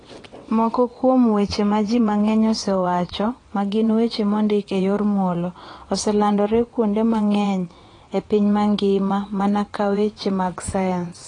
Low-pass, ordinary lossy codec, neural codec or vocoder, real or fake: 9.9 kHz; AAC, 32 kbps; vocoder, 22.05 kHz, 80 mel bands, WaveNeXt; fake